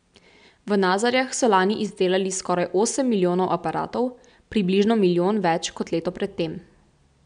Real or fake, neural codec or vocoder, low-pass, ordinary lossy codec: real; none; 9.9 kHz; none